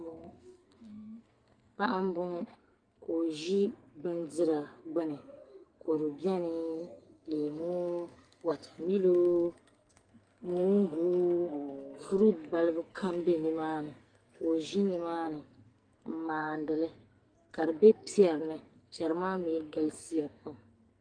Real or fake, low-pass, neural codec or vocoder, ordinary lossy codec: fake; 9.9 kHz; codec, 44.1 kHz, 2.6 kbps, SNAC; Opus, 32 kbps